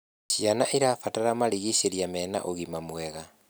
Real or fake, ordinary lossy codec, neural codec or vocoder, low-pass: real; none; none; none